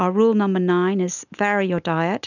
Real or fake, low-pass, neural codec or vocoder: real; 7.2 kHz; none